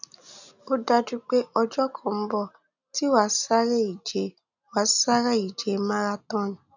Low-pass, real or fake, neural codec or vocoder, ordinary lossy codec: 7.2 kHz; real; none; none